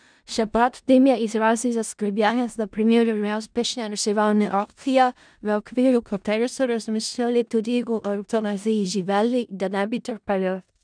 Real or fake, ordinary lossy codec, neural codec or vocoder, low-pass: fake; none; codec, 16 kHz in and 24 kHz out, 0.4 kbps, LongCat-Audio-Codec, four codebook decoder; 9.9 kHz